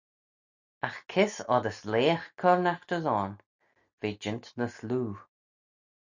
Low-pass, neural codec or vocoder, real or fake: 7.2 kHz; none; real